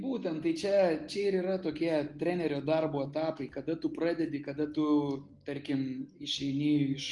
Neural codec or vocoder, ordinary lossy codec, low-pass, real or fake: none; Opus, 24 kbps; 10.8 kHz; real